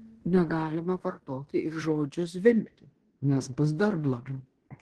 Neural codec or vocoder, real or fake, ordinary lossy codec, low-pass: codec, 16 kHz in and 24 kHz out, 0.9 kbps, LongCat-Audio-Codec, fine tuned four codebook decoder; fake; Opus, 16 kbps; 10.8 kHz